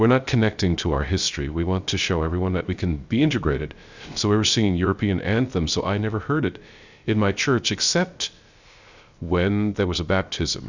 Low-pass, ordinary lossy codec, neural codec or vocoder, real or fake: 7.2 kHz; Opus, 64 kbps; codec, 16 kHz, 0.3 kbps, FocalCodec; fake